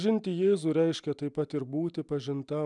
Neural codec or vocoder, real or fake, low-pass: none; real; 10.8 kHz